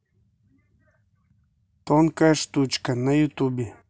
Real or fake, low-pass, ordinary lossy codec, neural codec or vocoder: real; none; none; none